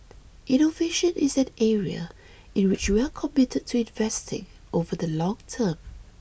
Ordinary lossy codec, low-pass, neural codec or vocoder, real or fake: none; none; none; real